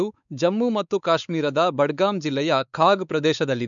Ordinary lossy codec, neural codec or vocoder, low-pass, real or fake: none; codec, 16 kHz, 4 kbps, X-Codec, WavLM features, trained on Multilingual LibriSpeech; 7.2 kHz; fake